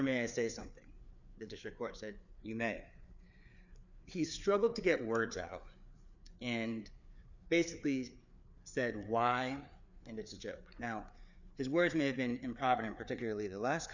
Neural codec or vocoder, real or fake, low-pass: codec, 16 kHz, 4 kbps, FreqCodec, larger model; fake; 7.2 kHz